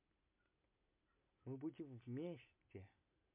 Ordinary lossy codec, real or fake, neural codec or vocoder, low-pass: MP3, 32 kbps; real; none; 3.6 kHz